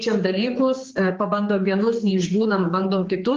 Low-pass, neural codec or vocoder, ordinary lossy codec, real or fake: 7.2 kHz; codec, 16 kHz, 4 kbps, X-Codec, HuBERT features, trained on general audio; Opus, 16 kbps; fake